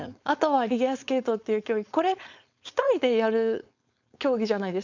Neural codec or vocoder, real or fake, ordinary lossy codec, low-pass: codec, 16 kHz, 4.8 kbps, FACodec; fake; none; 7.2 kHz